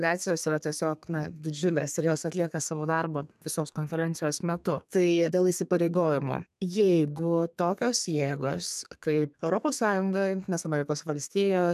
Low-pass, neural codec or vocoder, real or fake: 14.4 kHz; codec, 32 kHz, 1.9 kbps, SNAC; fake